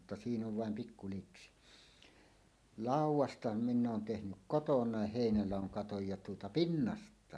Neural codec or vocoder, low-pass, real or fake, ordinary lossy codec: none; none; real; none